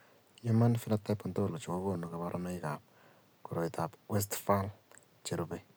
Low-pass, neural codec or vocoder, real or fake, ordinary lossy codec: none; none; real; none